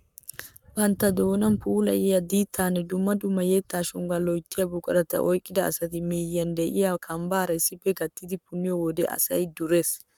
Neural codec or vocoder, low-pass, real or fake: codec, 44.1 kHz, 7.8 kbps, Pupu-Codec; 19.8 kHz; fake